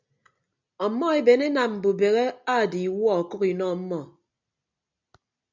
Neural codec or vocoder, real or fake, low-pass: none; real; 7.2 kHz